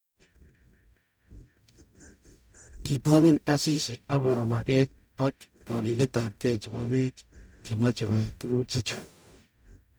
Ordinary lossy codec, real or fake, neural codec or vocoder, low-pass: none; fake; codec, 44.1 kHz, 0.9 kbps, DAC; none